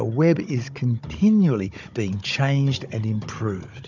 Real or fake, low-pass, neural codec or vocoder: fake; 7.2 kHz; codec, 16 kHz, 16 kbps, FunCodec, trained on Chinese and English, 50 frames a second